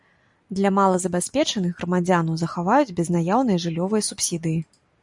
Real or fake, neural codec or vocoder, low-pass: real; none; 10.8 kHz